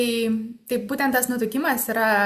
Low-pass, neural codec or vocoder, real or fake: 14.4 kHz; none; real